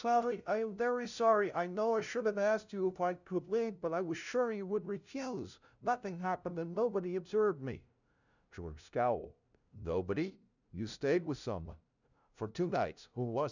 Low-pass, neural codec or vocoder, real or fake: 7.2 kHz; codec, 16 kHz, 0.5 kbps, FunCodec, trained on LibriTTS, 25 frames a second; fake